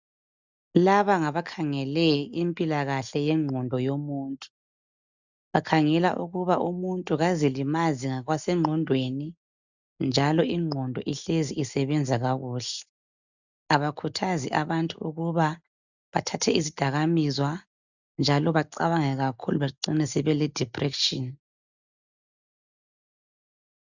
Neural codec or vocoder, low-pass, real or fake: none; 7.2 kHz; real